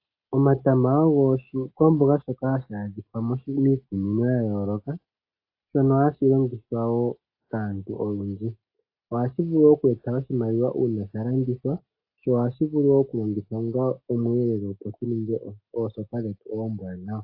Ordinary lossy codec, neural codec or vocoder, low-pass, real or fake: AAC, 32 kbps; none; 5.4 kHz; real